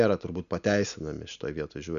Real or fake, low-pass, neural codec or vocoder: real; 7.2 kHz; none